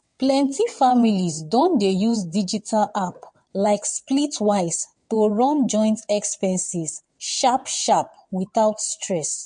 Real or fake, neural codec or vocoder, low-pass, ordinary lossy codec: fake; vocoder, 22.05 kHz, 80 mel bands, WaveNeXt; 9.9 kHz; MP3, 48 kbps